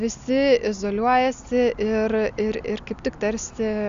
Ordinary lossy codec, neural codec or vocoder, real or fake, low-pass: Opus, 64 kbps; none; real; 7.2 kHz